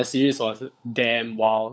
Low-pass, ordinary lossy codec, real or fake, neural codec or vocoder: none; none; fake; codec, 16 kHz, 16 kbps, FunCodec, trained on LibriTTS, 50 frames a second